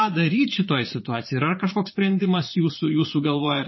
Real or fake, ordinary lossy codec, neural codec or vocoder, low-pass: fake; MP3, 24 kbps; vocoder, 22.05 kHz, 80 mel bands, Vocos; 7.2 kHz